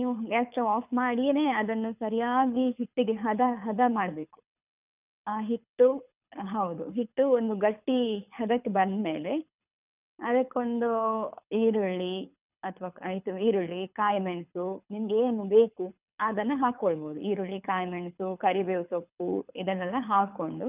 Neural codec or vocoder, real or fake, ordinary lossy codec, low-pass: codec, 24 kHz, 6 kbps, HILCodec; fake; none; 3.6 kHz